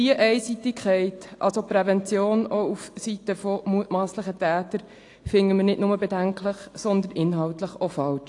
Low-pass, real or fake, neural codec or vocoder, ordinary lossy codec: 10.8 kHz; real; none; AAC, 48 kbps